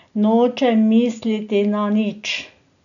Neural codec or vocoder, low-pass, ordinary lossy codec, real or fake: none; 7.2 kHz; none; real